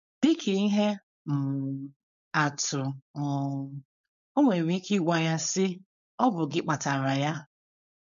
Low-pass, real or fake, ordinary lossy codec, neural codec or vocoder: 7.2 kHz; fake; MP3, 96 kbps; codec, 16 kHz, 4.8 kbps, FACodec